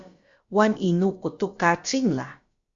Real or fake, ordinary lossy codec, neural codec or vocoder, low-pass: fake; Opus, 64 kbps; codec, 16 kHz, about 1 kbps, DyCAST, with the encoder's durations; 7.2 kHz